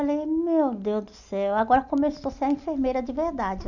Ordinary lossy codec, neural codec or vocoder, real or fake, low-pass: none; none; real; 7.2 kHz